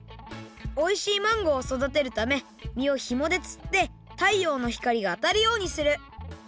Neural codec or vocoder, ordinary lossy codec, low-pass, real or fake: none; none; none; real